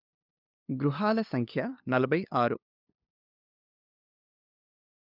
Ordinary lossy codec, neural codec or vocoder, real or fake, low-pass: none; codec, 16 kHz, 2 kbps, FunCodec, trained on LibriTTS, 25 frames a second; fake; 5.4 kHz